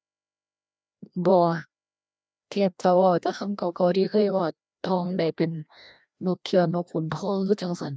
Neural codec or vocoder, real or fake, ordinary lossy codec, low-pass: codec, 16 kHz, 1 kbps, FreqCodec, larger model; fake; none; none